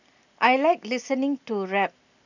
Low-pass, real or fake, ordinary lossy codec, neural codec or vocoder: 7.2 kHz; real; none; none